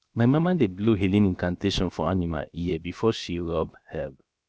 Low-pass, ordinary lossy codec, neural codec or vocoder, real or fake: none; none; codec, 16 kHz, 0.7 kbps, FocalCodec; fake